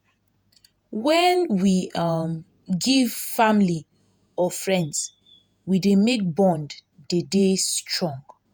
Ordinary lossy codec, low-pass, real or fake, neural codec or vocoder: none; none; fake; vocoder, 48 kHz, 128 mel bands, Vocos